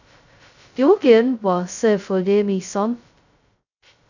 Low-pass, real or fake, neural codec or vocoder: 7.2 kHz; fake; codec, 16 kHz, 0.2 kbps, FocalCodec